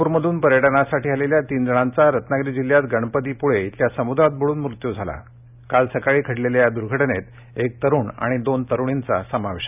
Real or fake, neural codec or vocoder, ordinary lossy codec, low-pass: real; none; none; 3.6 kHz